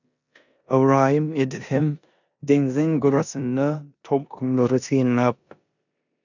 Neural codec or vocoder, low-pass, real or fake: codec, 16 kHz in and 24 kHz out, 0.9 kbps, LongCat-Audio-Codec, four codebook decoder; 7.2 kHz; fake